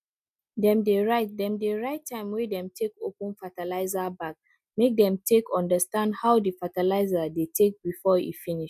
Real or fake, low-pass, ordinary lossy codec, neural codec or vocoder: real; 14.4 kHz; none; none